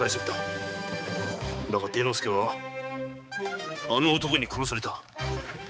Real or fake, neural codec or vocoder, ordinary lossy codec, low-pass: fake; codec, 16 kHz, 4 kbps, X-Codec, HuBERT features, trained on balanced general audio; none; none